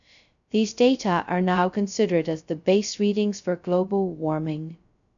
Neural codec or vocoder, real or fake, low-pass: codec, 16 kHz, 0.2 kbps, FocalCodec; fake; 7.2 kHz